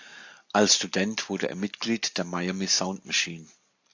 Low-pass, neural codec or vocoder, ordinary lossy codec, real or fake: 7.2 kHz; none; AAC, 48 kbps; real